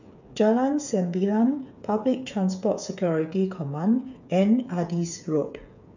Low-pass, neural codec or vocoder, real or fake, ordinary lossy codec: 7.2 kHz; codec, 16 kHz, 8 kbps, FreqCodec, smaller model; fake; none